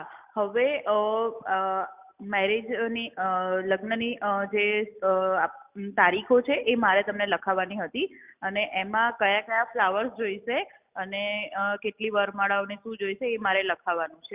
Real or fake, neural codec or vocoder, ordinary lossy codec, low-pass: real; none; Opus, 64 kbps; 3.6 kHz